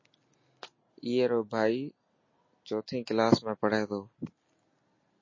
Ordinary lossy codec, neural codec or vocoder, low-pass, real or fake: MP3, 32 kbps; none; 7.2 kHz; real